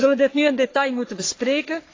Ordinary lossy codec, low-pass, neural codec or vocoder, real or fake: AAC, 32 kbps; 7.2 kHz; codec, 44.1 kHz, 3.4 kbps, Pupu-Codec; fake